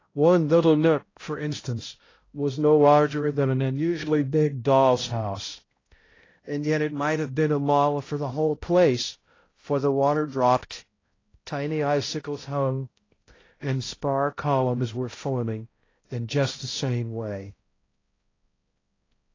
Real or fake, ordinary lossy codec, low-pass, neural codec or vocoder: fake; AAC, 32 kbps; 7.2 kHz; codec, 16 kHz, 0.5 kbps, X-Codec, HuBERT features, trained on balanced general audio